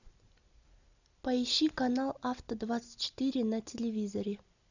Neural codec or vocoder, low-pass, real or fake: none; 7.2 kHz; real